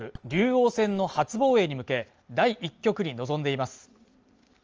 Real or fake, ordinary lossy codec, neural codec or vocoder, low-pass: real; Opus, 24 kbps; none; 7.2 kHz